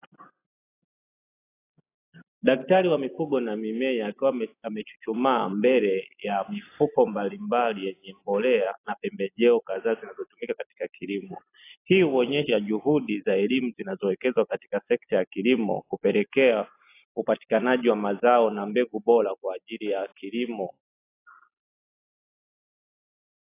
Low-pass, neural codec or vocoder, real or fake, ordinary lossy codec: 3.6 kHz; none; real; AAC, 24 kbps